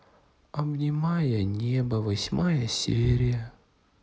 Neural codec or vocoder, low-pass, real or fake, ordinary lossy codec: none; none; real; none